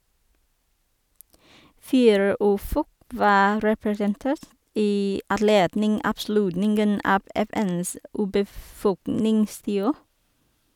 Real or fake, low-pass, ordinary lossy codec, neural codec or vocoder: real; 19.8 kHz; none; none